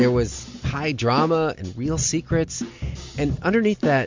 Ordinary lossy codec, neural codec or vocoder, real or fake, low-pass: MP3, 64 kbps; none; real; 7.2 kHz